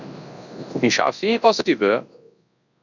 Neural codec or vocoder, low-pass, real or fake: codec, 24 kHz, 0.9 kbps, WavTokenizer, large speech release; 7.2 kHz; fake